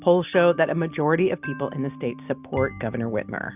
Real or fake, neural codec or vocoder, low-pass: real; none; 3.6 kHz